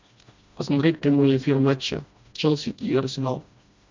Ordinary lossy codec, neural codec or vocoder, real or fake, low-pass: MP3, 64 kbps; codec, 16 kHz, 1 kbps, FreqCodec, smaller model; fake; 7.2 kHz